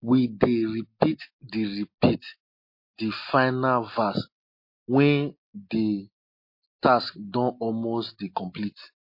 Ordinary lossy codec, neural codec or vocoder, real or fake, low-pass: MP3, 32 kbps; none; real; 5.4 kHz